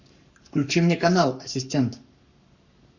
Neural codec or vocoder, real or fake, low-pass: codec, 44.1 kHz, 7.8 kbps, Pupu-Codec; fake; 7.2 kHz